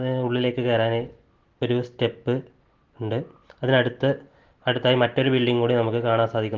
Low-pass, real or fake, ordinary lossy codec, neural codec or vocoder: 7.2 kHz; real; Opus, 16 kbps; none